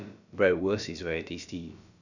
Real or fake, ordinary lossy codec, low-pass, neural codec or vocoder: fake; none; 7.2 kHz; codec, 16 kHz, about 1 kbps, DyCAST, with the encoder's durations